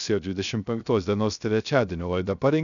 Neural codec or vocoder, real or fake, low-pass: codec, 16 kHz, 0.3 kbps, FocalCodec; fake; 7.2 kHz